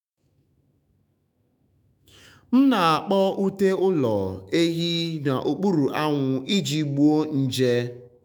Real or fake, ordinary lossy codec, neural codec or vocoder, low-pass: fake; none; autoencoder, 48 kHz, 128 numbers a frame, DAC-VAE, trained on Japanese speech; 19.8 kHz